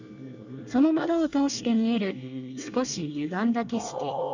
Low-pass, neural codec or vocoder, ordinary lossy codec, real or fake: 7.2 kHz; codec, 24 kHz, 1 kbps, SNAC; none; fake